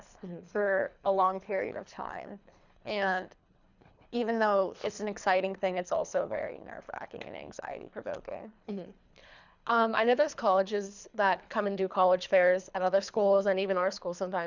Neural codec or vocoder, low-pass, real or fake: codec, 24 kHz, 3 kbps, HILCodec; 7.2 kHz; fake